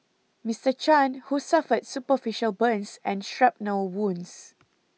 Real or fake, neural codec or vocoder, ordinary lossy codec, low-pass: real; none; none; none